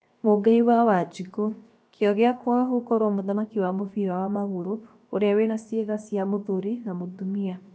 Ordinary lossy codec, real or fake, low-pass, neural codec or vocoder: none; fake; none; codec, 16 kHz, 0.7 kbps, FocalCodec